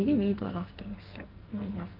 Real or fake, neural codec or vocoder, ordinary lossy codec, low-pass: fake; codec, 44.1 kHz, 2.6 kbps, SNAC; Opus, 24 kbps; 5.4 kHz